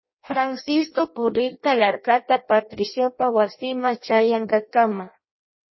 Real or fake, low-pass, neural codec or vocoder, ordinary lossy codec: fake; 7.2 kHz; codec, 16 kHz in and 24 kHz out, 0.6 kbps, FireRedTTS-2 codec; MP3, 24 kbps